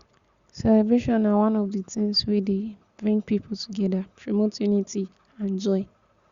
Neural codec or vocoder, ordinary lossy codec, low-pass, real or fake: none; Opus, 64 kbps; 7.2 kHz; real